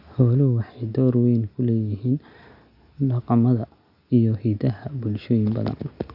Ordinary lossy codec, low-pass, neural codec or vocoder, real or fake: none; 5.4 kHz; none; real